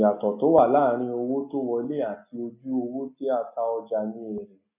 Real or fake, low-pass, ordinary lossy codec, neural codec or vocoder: real; 3.6 kHz; none; none